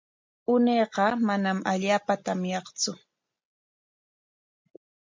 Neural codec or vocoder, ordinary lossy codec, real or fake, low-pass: none; MP3, 64 kbps; real; 7.2 kHz